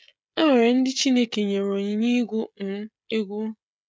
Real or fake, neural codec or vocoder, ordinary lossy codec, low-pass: fake; codec, 16 kHz, 16 kbps, FreqCodec, smaller model; none; none